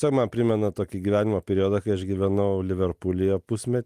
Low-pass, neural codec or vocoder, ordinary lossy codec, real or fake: 14.4 kHz; none; Opus, 24 kbps; real